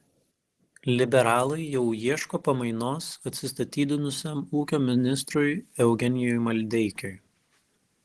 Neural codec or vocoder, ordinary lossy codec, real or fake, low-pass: none; Opus, 16 kbps; real; 10.8 kHz